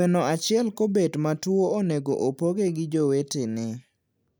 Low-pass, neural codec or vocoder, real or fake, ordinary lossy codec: none; none; real; none